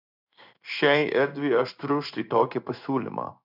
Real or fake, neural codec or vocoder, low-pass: fake; codec, 16 kHz in and 24 kHz out, 1 kbps, XY-Tokenizer; 5.4 kHz